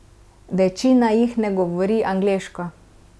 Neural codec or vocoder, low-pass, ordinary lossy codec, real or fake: none; none; none; real